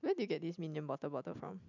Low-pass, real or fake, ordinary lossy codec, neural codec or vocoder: 7.2 kHz; real; none; none